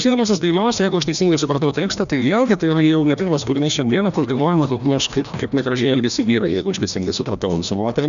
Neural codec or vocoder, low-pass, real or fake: codec, 16 kHz, 1 kbps, FreqCodec, larger model; 7.2 kHz; fake